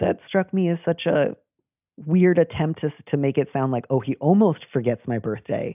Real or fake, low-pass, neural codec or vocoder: real; 3.6 kHz; none